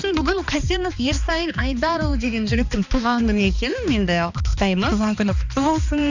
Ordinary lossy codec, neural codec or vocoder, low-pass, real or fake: none; codec, 16 kHz, 2 kbps, X-Codec, HuBERT features, trained on balanced general audio; 7.2 kHz; fake